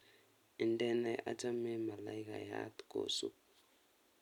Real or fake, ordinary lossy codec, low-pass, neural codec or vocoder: real; none; 19.8 kHz; none